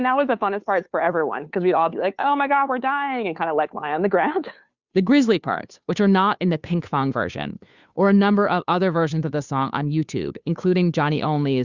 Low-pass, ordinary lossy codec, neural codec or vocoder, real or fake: 7.2 kHz; Opus, 64 kbps; codec, 16 kHz, 2 kbps, FunCodec, trained on Chinese and English, 25 frames a second; fake